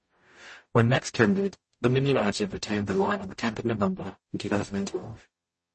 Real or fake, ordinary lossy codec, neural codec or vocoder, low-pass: fake; MP3, 32 kbps; codec, 44.1 kHz, 0.9 kbps, DAC; 10.8 kHz